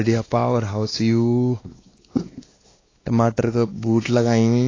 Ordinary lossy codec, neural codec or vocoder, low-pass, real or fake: AAC, 32 kbps; codec, 16 kHz, 2 kbps, X-Codec, WavLM features, trained on Multilingual LibriSpeech; 7.2 kHz; fake